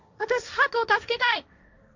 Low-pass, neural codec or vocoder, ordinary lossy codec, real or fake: 7.2 kHz; codec, 16 kHz, 1.1 kbps, Voila-Tokenizer; none; fake